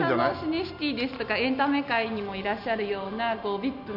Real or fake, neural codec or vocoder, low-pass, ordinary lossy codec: real; none; 5.4 kHz; none